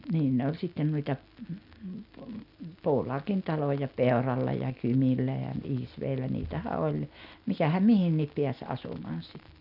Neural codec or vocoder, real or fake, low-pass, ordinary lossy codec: none; real; 5.4 kHz; none